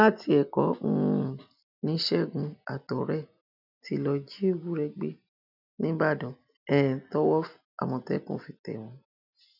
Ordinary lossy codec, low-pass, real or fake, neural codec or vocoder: none; 5.4 kHz; real; none